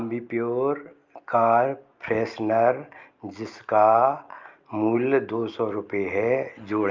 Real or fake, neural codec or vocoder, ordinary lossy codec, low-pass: real; none; Opus, 24 kbps; 7.2 kHz